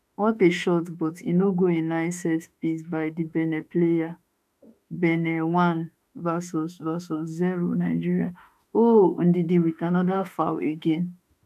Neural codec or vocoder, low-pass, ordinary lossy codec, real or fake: autoencoder, 48 kHz, 32 numbers a frame, DAC-VAE, trained on Japanese speech; 14.4 kHz; none; fake